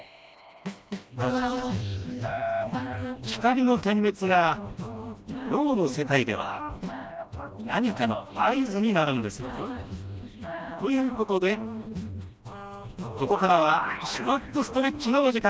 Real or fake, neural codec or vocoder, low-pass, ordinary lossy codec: fake; codec, 16 kHz, 1 kbps, FreqCodec, smaller model; none; none